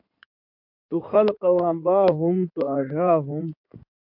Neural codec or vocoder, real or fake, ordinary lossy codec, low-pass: codec, 16 kHz in and 24 kHz out, 2.2 kbps, FireRedTTS-2 codec; fake; MP3, 48 kbps; 5.4 kHz